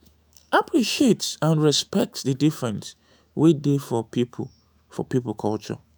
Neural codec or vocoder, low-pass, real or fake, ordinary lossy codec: autoencoder, 48 kHz, 128 numbers a frame, DAC-VAE, trained on Japanese speech; none; fake; none